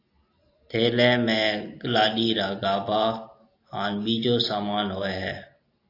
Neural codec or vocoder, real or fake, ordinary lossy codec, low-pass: none; real; AAC, 32 kbps; 5.4 kHz